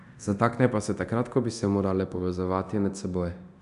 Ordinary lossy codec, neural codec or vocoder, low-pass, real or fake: none; codec, 24 kHz, 0.9 kbps, DualCodec; 10.8 kHz; fake